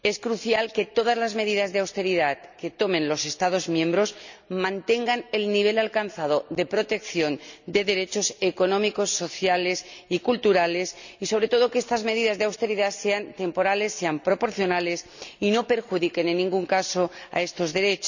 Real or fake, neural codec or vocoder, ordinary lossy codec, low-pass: real; none; none; 7.2 kHz